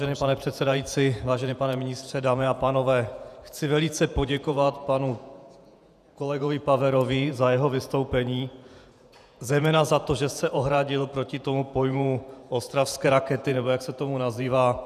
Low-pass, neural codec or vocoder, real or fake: 14.4 kHz; vocoder, 48 kHz, 128 mel bands, Vocos; fake